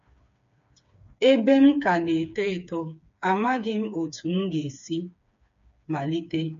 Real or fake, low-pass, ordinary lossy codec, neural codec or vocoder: fake; 7.2 kHz; MP3, 48 kbps; codec, 16 kHz, 4 kbps, FreqCodec, smaller model